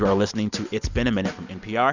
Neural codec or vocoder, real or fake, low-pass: none; real; 7.2 kHz